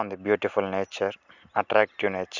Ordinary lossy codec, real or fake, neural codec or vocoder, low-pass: none; real; none; 7.2 kHz